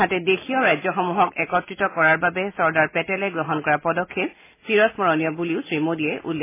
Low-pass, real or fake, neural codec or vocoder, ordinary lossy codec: 3.6 kHz; real; none; MP3, 16 kbps